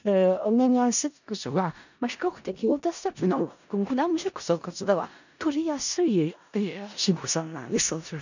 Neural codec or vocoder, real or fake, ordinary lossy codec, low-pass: codec, 16 kHz in and 24 kHz out, 0.4 kbps, LongCat-Audio-Codec, four codebook decoder; fake; none; 7.2 kHz